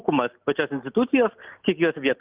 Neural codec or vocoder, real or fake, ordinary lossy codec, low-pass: none; real; Opus, 32 kbps; 3.6 kHz